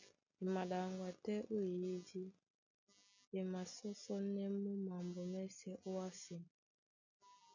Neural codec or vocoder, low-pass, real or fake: none; 7.2 kHz; real